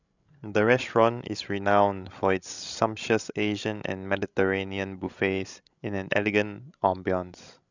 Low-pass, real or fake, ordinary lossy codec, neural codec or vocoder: 7.2 kHz; fake; none; codec, 16 kHz, 16 kbps, FreqCodec, larger model